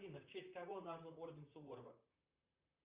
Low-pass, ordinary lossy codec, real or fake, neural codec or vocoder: 3.6 kHz; Opus, 64 kbps; fake; vocoder, 44.1 kHz, 128 mel bands, Pupu-Vocoder